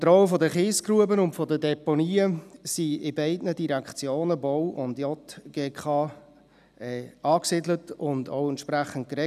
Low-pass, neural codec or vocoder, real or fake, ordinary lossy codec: 14.4 kHz; none; real; none